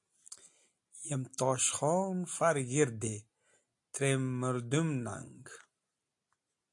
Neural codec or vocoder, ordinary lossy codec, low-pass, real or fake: none; AAC, 64 kbps; 10.8 kHz; real